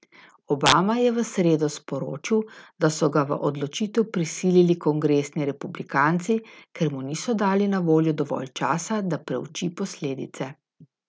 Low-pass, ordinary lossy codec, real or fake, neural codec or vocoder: none; none; real; none